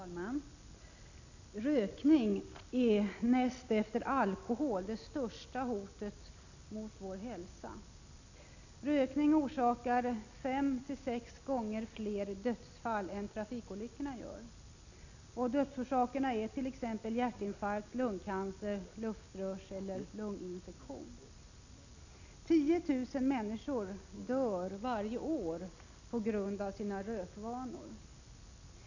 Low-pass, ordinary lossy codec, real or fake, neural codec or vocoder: 7.2 kHz; none; real; none